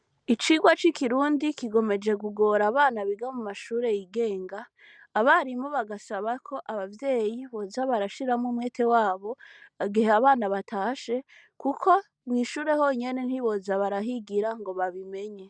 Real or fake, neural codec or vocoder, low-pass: real; none; 9.9 kHz